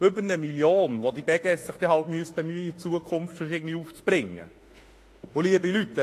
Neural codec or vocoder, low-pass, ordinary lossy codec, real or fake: autoencoder, 48 kHz, 32 numbers a frame, DAC-VAE, trained on Japanese speech; 14.4 kHz; AAC, 48 kbps; fake